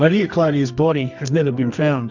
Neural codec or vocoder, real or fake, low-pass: codec, 24 kHz, 0.9 kbps, WavTokenizer, medium music audio release; fake; 7.2 kHz